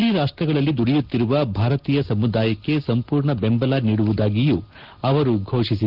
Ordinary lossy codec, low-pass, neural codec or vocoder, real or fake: Opus, 16 kbps; 5.4 kHz; none; real